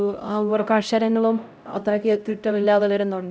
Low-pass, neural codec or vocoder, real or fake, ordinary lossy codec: none; codec, 16 kHz, 0.5 kbps, X-Codec, HuBERT features, trained on LibriSpeech; fake; none